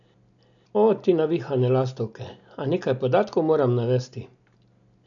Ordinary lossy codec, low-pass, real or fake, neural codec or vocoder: none; 7.2 kHz; real; none